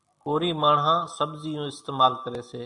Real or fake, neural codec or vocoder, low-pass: real; none; 10.8 kHz